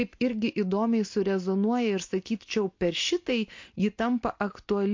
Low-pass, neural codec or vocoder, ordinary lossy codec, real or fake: 7.2 kHz; none; MP3, 48 kbps; real